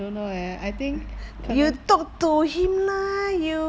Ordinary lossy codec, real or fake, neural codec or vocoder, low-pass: none; real; none; none